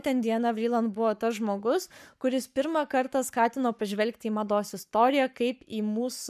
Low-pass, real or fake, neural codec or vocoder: 14.4 kHz; fake; codec, 44.1 kHz, 7.8 kbps, Pupu-Codec